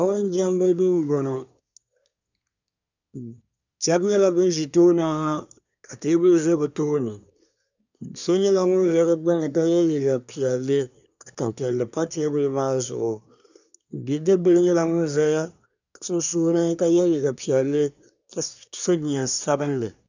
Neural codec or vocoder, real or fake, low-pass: codec, 24 kHz, 1 kbps, SNAC; fake; 7.2 kHz